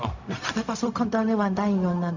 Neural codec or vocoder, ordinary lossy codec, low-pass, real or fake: codec, 16 kHz, 0.4 kbps, LongCat-Audio-Codec; none; 7.2 kHz; fake